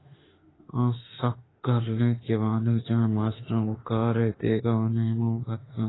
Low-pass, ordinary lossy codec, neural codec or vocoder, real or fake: 7.2 kHz; AAC, 16 kbps; autoencoder, 48 kHz, 32 numbers a frame, DAC-VAE, trained on Japanese speech; fake